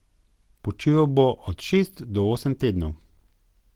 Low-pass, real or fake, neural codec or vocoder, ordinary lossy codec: 19.8 kHz; real; none; Opus, 16 kbps